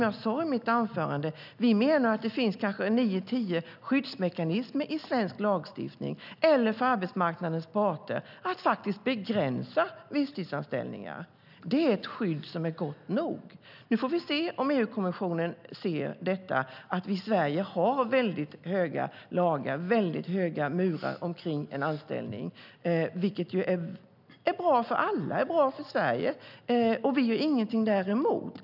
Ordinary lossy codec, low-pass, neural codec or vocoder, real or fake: none; 5.4 kHz; none; real